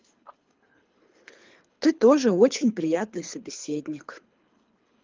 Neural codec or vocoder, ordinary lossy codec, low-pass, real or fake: codec, 24 kHz, 3 kbps, HILCodec; Opus, 24 kbps; 7.2 kHz; fake